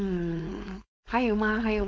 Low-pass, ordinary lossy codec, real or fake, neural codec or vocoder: none; none; fake; codec, 16 kHz, 4.8 kbps, FACodec